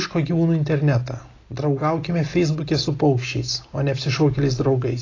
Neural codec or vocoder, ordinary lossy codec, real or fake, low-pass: none; AAC, 32 kbps; real; 7.2 kHz